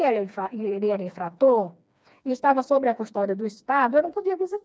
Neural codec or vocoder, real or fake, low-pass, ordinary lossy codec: codec, 16 kHz, 2 kbps, FreqCodec, smaller model; fake; none; none